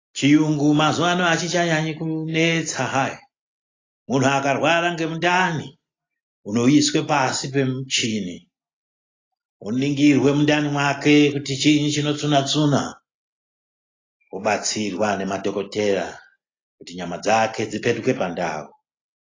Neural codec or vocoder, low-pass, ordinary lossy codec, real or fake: none; 7.2 kHz; AAC, 32 kbps; real